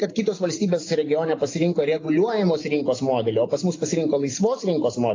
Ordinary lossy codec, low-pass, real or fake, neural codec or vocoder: AAC, 32 kbps; 7.2 kHz; real; none